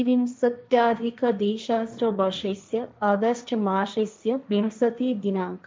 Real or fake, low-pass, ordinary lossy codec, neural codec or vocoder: fake; none; none; codec, 16 kHz, 1.1 kbps, Voila-Tokenizer